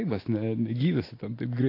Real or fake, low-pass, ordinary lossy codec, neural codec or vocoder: real; 5.4 kHz; AAC, 24 kbps; none